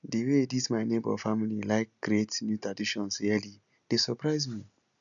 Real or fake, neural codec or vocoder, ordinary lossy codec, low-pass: real; none; none; 7.2 kHz